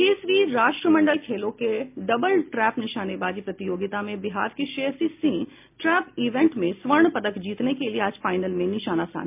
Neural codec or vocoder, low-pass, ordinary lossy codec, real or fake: none; 3.6 kHz; MP3, 32 kbps; real